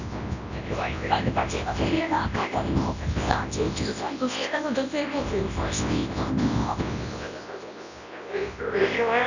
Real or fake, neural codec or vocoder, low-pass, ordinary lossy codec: fake; codec, 24 kHz, 0.9 kbps, WavTokenizer, large speech release; 7.2 kHz; none